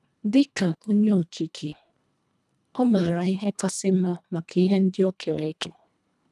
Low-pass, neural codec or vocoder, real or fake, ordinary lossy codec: none; codec, 24 kHz, 1.5 kbps, HILCodec; fake; none